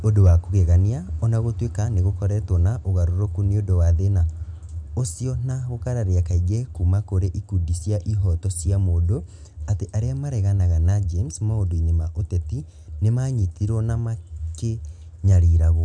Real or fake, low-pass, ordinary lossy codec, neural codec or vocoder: real; 9.9 kHz; none; none